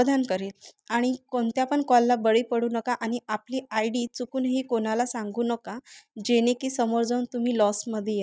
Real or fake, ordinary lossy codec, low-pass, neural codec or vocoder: real; none; none; none